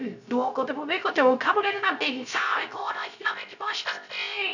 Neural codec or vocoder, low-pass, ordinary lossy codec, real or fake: codec, 16 kHz, 0.3 kbps, FocalCodec; 7.2 kHz; none; fake